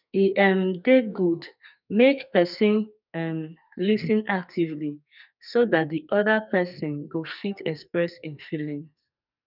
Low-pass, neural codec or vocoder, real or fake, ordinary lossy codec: 5.4 kHz; codec, 44.1 kHz, 2.6 kbps, SNAC; fake; none